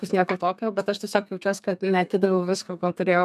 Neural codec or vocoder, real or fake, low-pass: codec, 32 kHz, 1.9 kbps, SNAC; fake; 14.4 kHz